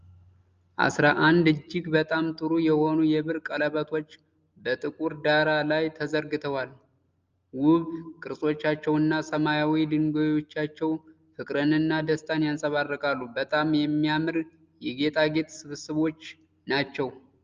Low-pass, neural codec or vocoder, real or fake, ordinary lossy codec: 7.2 kHz; none; real; Opus, 24 kbps